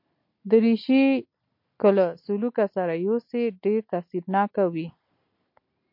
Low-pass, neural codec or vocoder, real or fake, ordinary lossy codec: 5.4 kHz; none; real; MP3, 32 kbps